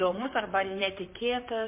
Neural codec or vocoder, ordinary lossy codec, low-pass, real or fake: vocoder, 44.1 kHz, 128 mel bands, Pupu-Vocoder; MP3, 32 kbps; 3.6 kHz; fake